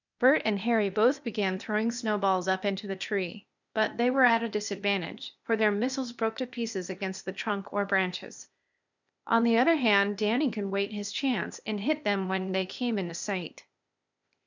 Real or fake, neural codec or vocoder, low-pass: fake; codec, 16 kHz, 0.8 kbps, ZipCodec; 7.2 kHz